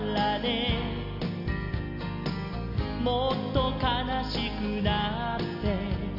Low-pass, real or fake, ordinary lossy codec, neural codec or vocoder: 5.4 kHz; real; none; none